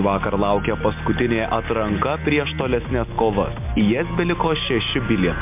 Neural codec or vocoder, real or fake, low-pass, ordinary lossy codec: none; real; 3.6 kHz; MP3, 32 kbps